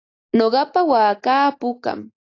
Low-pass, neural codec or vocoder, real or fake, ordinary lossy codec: 7.2 kHz; none; real; AAC, 32 kbps